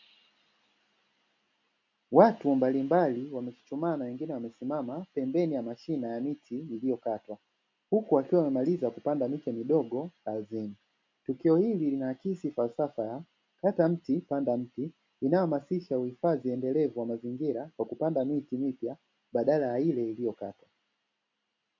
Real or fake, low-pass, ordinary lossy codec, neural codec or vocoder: real; 7.2 kHz; MP3, 48 kbps; none